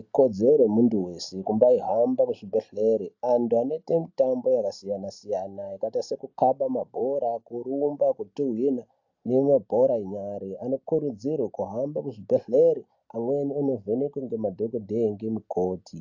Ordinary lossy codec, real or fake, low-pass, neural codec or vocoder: AAC, 48 kbps; real; 7.2 kHz; none